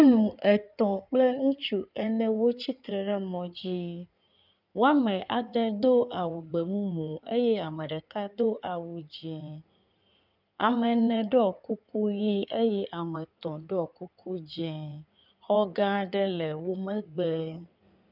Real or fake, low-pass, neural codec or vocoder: fake; 5.4 kHz; codec, 16 kHz in and 24 kHz out, 2.2 kbps, FireRedTTS-2 codec